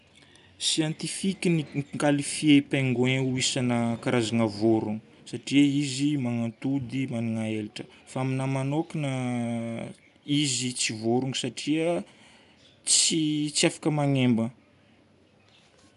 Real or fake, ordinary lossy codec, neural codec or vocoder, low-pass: real; none; none; 10.8 kHz